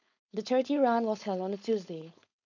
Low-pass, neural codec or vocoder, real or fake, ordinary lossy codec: 7.2 kHz; codec, 16 kHz, 4.8 kbps, FACodec; fake; none